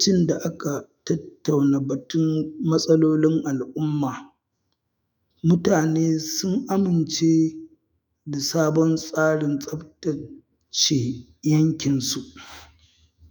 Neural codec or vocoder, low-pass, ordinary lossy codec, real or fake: autoencoder, 48 kHz, 128 numbers a frame, DAC-VAE, trained on Japanese speech; none; none; fake